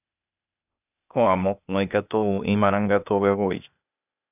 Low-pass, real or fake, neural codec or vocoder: 3.6 kHz; fake; codec, 16 kHz, 0.8 kbps, ZipCodec